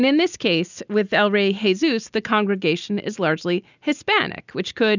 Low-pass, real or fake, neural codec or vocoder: 7.2 kHz; real; none